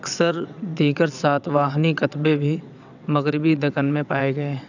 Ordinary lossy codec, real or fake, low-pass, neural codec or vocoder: none; fake; 7.2 kHz; vocoder, 22.05 kHz, 80 mel bands, WaveNeXt